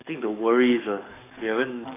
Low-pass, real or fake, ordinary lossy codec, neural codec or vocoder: 3.6 kHz; fake; none; codec, 44.1 kHz, 7.8 kbps, DAC